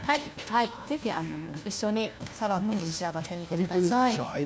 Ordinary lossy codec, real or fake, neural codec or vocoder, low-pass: none; fake; codec, 16 kHz, 1 kbps, FunCodec, trained on LibriTTS, 50 frames a second; none